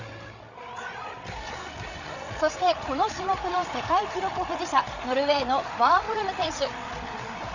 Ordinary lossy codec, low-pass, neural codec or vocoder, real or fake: none; 7.2 kHz; codec, 16 kHz, 8 kbps, FreqCodec, larger model; fake